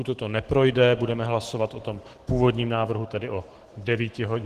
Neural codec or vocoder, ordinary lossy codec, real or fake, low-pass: autoencoder, 48 kHz, 128 numbers a frame, DAC-VAE, trained on Japanese speech; Opus, 16 kbps; fake; 14.4 kHz